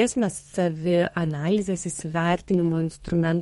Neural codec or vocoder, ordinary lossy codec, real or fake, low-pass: codec, 32 kHz, 1.9 kbps, SNAC; MP3, 48 kbps; fake; 14.4 kHz